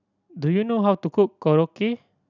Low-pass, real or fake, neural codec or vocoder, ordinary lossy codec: 7.2 kHz; real; none; none